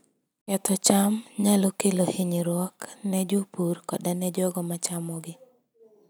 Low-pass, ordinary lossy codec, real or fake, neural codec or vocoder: none; none; real; none